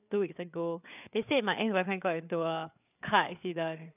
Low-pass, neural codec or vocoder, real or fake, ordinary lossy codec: 3.6 kHz; codec, 16 kHz, 4 kbps, FreqCodec, larger model; fake; none